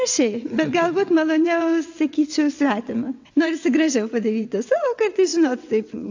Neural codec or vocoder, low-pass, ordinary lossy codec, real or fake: vocoder, 22.05 kHz, 80 mel bands, WaveNeXt; 7.2 kHz; AAC, 48 kbps; fake